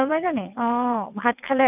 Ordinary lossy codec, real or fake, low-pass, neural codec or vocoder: none; fake; 3.6 kHz; vocoder, 22.05 kHz, 80 mel bands, WaveNeXt